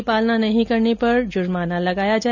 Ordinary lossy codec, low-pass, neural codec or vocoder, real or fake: none; 7.2 kHz; none; real